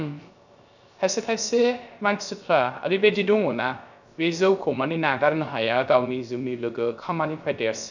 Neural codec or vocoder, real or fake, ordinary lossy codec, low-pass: codec, 16 kHz, 0.3 kbps, FocalCodec; fake; none; 7.2 kHz